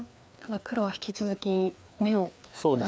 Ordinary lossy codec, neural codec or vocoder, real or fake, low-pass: none; codec, 16 kHz, 2 kbps, FreqCodec, larger model; fake; none